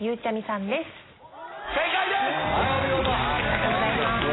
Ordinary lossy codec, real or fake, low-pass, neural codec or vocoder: AAC, 16 kbps; real; 7.2 kHz; none